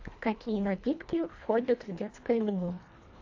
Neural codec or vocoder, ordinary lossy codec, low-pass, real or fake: codec, 24 kHz, 1.5 kbps, HILCodec; AAC, 48 kbps; 7.2 kHz; fake